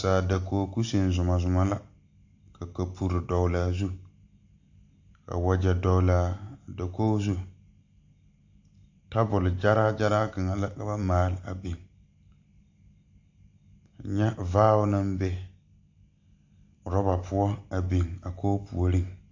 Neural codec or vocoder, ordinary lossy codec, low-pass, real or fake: none; AAC, 48 kbps; 7.2 kHz; real